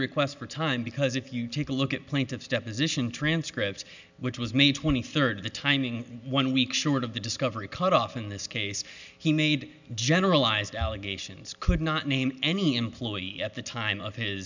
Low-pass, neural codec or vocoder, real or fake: 7.2 kHz; none; real